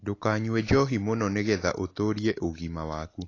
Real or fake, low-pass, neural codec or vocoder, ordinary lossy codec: real; 7.2 kHz; none; AAC, 32 kbps